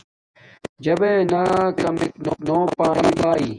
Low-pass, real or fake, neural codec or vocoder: 9.9 kHz; fake; vocoder, 48 kHz, 128 mel bands, Vocos